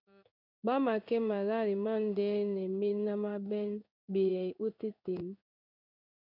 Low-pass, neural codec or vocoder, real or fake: 5.4 kHz; codec, 16 kHz in and 24 kHz out, 1 kbps, XY-Tokenizer; fake